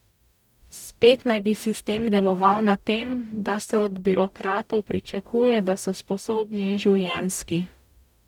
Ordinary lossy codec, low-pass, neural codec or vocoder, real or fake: none; 19.8 kHz; codec, 44.1 kHz, 0.9 kbps, DAC; fake